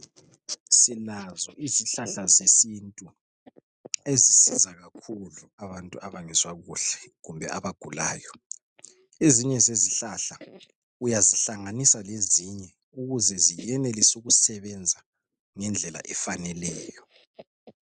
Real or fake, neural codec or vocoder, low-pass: real; none; 9.9 kHz